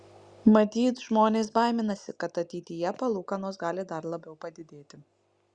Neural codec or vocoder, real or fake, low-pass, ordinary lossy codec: none; real; 9.9 kHz; Opus, 64 kbps